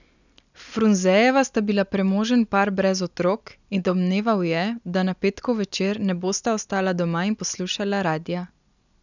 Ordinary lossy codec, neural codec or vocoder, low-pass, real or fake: none; none; 7.2 kHz; real